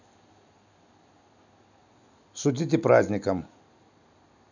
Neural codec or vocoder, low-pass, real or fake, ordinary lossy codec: none; 7.2 kHz; real; none